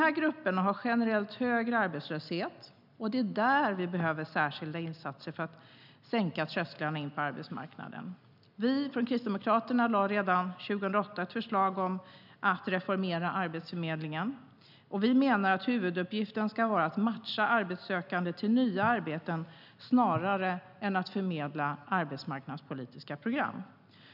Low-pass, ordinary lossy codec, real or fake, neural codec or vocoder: 5.4 kHz; none; real; none